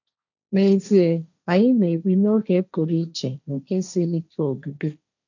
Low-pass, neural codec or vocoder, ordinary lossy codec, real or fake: 7.2 kHz; codec, 16 kHz, 1.1 kbps, Voila-Tokenizer; none; fake